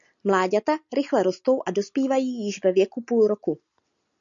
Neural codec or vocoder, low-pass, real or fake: none; 7.2 kHz; real